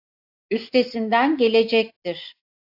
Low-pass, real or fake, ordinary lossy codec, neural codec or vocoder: 5.4 kHz; real; AAC, 48 kbps; none